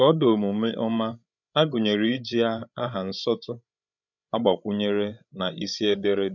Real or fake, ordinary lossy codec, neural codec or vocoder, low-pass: fake; none; codec, 16 kHz, 16 kbps, FreqCodec, larger model; 7.2 kHz